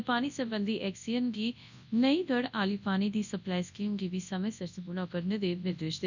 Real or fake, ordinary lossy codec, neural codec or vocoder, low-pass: fake; none; codec, 24 kHz, 0.9 kbps, WavTokenizer, large speech release; 7.2 kHz